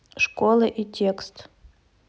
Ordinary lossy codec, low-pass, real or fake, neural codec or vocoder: none; none; real; none